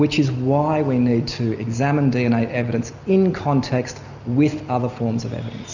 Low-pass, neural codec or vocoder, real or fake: 7.2 kHz; none; real